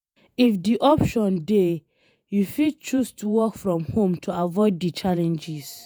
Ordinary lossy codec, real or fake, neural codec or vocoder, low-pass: none; fake; vocoder, 48 kHz, 128 mel bands, Vocos; none